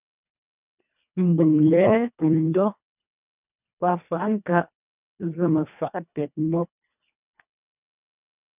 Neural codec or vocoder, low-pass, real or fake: codec, 24 kHz, 1.5 kbps, HILCodec; 3.6 kHz; fake